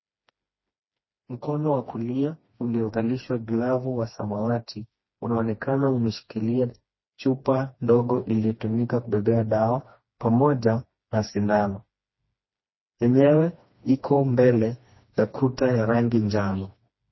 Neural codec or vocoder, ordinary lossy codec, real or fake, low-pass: codec, 16 kHz, 2 kbps, FreqCodec, smaller model; MP3, 24 kbps; fake; 7.2 kHz